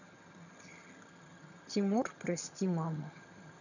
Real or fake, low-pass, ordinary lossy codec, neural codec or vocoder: fake; 7.2 kHz; none; vocoder, 22.05 kHz, 80 mel bands, HiFi-GAN